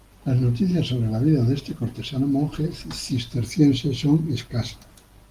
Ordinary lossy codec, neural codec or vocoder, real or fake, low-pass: Opus, 24 kbps; none; real; 14.4 kHz